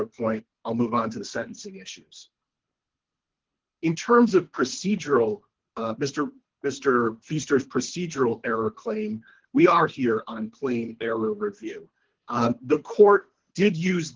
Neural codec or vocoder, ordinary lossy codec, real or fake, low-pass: codec, 24 kHz, 3 kbps, HILCodec; Opus, 16 kbps; fake; 7.2 kHz